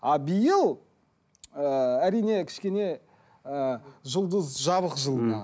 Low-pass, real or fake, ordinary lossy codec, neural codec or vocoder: none; real; none; none